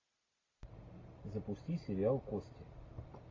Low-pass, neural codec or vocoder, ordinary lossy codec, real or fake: 7.2 kHz; none; AAC, 32 kbps; real